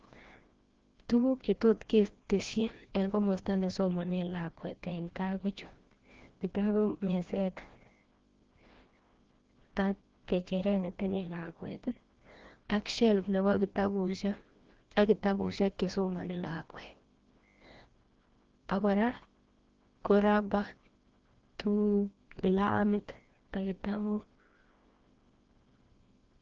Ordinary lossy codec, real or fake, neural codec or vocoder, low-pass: Opus, 16 kbps; fake; codec, 16 kHz, 1 kbps, FreqCodec, larger model; 7.2 kHz